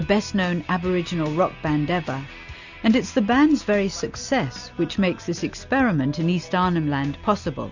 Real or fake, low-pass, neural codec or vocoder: real; 7.2 kHz; none